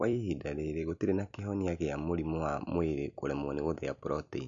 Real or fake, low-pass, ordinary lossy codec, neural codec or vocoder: real; 7.2 kHz; MP3, 64 kbps; none